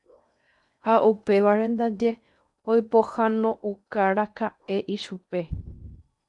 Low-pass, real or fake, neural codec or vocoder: 10.8 kHz; fake; codec, 16 kHz in and 24 kHz out, 0.8 kbps, FocalCodec, streaming, 65536 codes